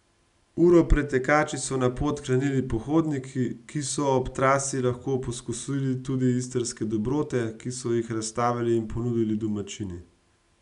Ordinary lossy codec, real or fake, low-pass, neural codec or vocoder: none; real; 10.8 kHz; none